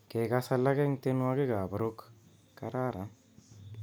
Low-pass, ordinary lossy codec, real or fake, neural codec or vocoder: none; none; real; none